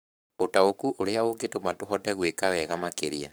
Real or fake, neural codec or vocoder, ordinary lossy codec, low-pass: fake; codec, 44.1 kHz, 7.8 kbps, Pupu-Codec; none; none